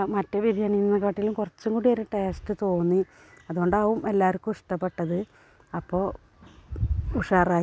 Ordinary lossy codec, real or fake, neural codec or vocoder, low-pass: none; real; none; none